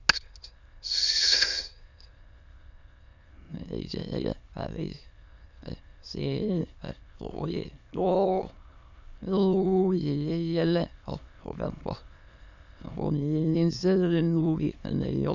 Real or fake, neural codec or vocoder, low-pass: fake; autoencoder, 22.05 kHz, a latent of 192 numbers a frame, VITS, trained on many speakers; 7.2 kHz